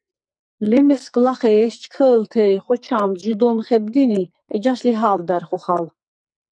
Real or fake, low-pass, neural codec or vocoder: fake; 9.9 kHz; codec, 44.1 kHz, 2.6 kbps, SNAC